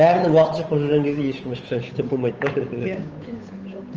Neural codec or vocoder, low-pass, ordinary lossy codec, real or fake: codec, 16 kHz, 8 kbps, FunCodec, trained on Chinese and English, 25 frames a second; 7.2 kHz; Opus, 24 kbps; fake